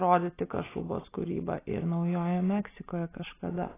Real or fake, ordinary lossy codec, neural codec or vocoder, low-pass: real; AAC, 16 kbps; none; 3.6 kHz